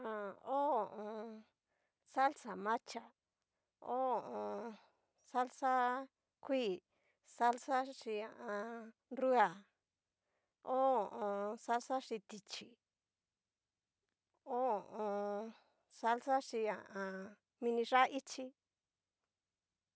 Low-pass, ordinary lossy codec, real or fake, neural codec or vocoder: none; none; real; none